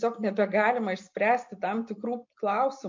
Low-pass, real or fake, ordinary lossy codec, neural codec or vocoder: 7.2 kHz; real; MP3, 48 kbps; none